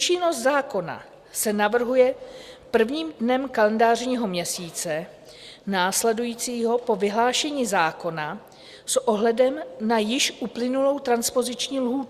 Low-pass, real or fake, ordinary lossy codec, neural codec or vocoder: 14.4 kHz; real; Opus, 64 kbps; none